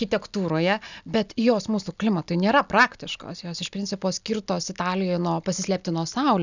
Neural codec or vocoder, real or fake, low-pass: none; real; 7.2 kHz